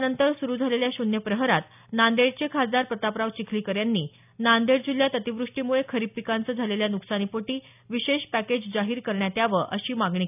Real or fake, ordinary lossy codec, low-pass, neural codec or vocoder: real; none; 3.6 kHz; none